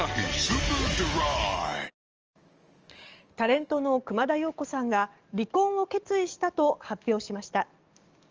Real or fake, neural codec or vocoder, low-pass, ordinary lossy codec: fake; codec, 44.1 kHz, 7.8 kbps, DAC; 7.2 kHz; Opus, 24 kbps